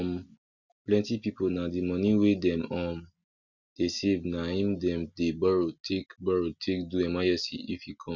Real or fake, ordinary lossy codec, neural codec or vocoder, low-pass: real; none; none; 7.2 kHz